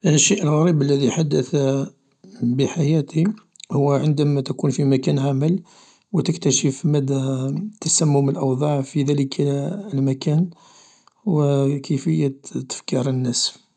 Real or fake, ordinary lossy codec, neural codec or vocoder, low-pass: real; none; none; 10.8 kHz